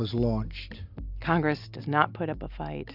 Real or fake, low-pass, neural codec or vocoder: real; 5.4 kHz; none